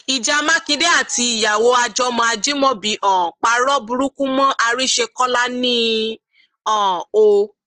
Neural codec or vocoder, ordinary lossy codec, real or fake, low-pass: none; Opus, 16 kbps; real; 9.9 kHz